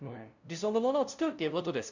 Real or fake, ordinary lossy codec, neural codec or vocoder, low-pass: fake; none; codec, 16 kHz, 0.5 kbps, FunCodec, trained on LibriTTS, 25 frames a second; 7.2 kHz